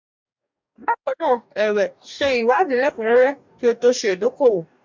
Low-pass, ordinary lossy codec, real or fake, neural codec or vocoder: 7.2 kHz; MP3, 64 kbps; fake; codec, 44.1 kHz, 2.6 kbps, DAC